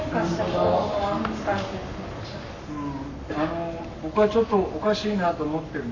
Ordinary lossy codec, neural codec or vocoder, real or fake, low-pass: none; codec, 44.1 kHz, 7.8 kbps, Pupu-Codec; fake; 7.2 kHz